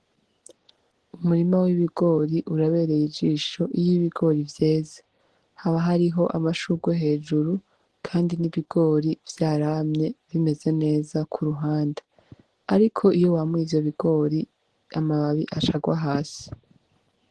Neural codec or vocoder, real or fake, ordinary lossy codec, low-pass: none; real; Opus, 16 kbps; 10.8 kHz